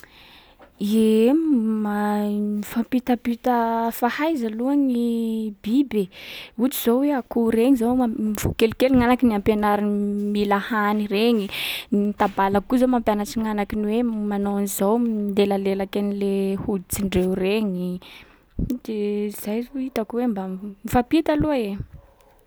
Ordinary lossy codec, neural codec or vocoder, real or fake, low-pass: none; none; real; none